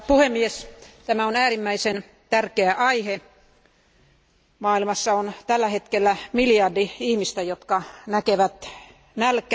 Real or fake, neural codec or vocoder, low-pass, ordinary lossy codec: real; none; none; none